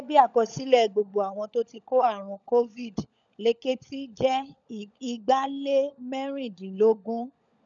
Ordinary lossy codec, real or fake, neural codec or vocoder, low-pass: none; fake; codec, 16 kHz, 16 kbps, FunCodec, trained on LibriTTS, 50 frames a second; 7.2 kHz